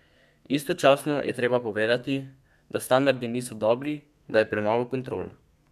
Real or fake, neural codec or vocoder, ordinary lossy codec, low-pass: fake; codec, 32 kHz, 1.9 kbps, SNAC; none; 14.4 kHz